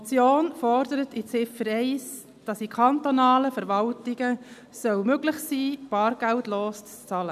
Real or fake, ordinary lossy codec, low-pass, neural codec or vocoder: real; none; 14.4 kHz; none